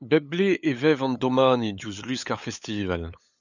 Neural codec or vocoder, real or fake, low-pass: codec, 16 kHz, 8 kbps, FunCodec, trained on LibriTTS, 25 frames a second; fake; 7.2 kHz